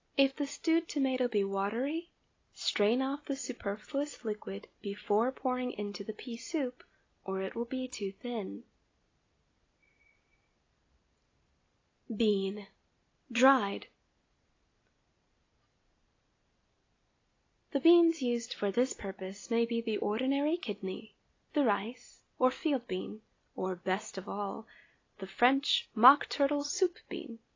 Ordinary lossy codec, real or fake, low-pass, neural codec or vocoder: AAC, 32 kbps; real; 7.2 kHz; none